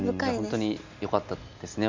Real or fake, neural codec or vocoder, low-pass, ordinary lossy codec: real; none; 7.2 kHz; MP3, 64 kbps